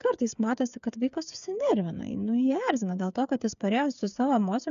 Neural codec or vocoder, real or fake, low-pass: codec, 16 kHz, 8 kbps, FreqCodec, smaller model; fake; 7.2 kHz